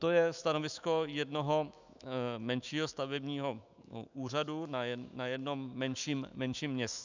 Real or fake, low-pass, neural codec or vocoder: fake; 7.2 kHz; autoencoder, 48 kHz, 128 numbers a frame, DAC-VAE, trained on Japanese speech